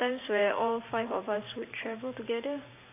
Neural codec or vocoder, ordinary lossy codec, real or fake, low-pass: vocoder, 44.1 kHz, 128 mel bands every 512 samples, BigVGAN v2; AAC, 24 kbps; fake; 3.6 kHz